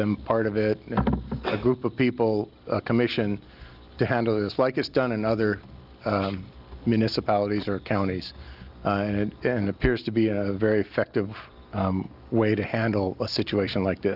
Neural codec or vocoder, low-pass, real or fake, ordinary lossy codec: none; 5.4 kHz; real; Opus, 32 kbps